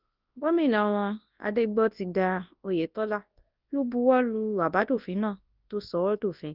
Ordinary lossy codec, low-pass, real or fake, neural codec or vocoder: Opus, 24 kbps; 5.4 kHz; fake; codec, 24 kHz, 0.9 kbps, WavTokenizer, large speech release